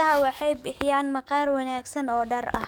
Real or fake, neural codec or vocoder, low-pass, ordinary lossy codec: fake; codec, 44.1 kHz, 7.8 kbps, DAC; 19.8 kHz; none